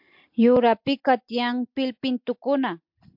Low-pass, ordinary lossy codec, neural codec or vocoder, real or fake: 5.4 kHz; MP3, 48 kbps; none; real